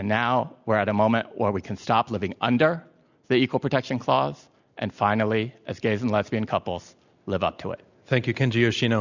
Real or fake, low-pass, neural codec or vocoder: real; 7.2 kHz; none